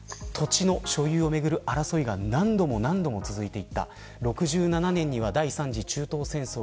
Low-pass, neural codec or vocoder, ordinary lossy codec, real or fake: none; none; none; real